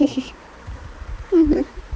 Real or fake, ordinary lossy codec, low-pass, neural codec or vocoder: fake; none; none; codec, 16 kHz, 4 kbps, X-Codec, HuBERT features, trained on balanced general audio